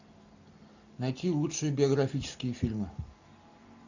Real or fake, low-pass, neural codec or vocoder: real; 7.2 kHz; none